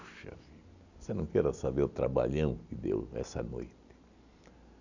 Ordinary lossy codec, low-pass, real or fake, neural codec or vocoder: none; 7.2 kHz; real; none